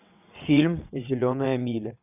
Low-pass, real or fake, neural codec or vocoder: 3.6 kHz; fake; vocoder, 22.05 kHz, 80 mel bands, WaveNeXt